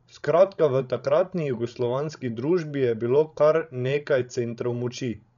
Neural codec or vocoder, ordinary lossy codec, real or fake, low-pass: codec, 16 kHz, 16 kbps, FreqCodec, larger model; none; fake; 7.2 kHz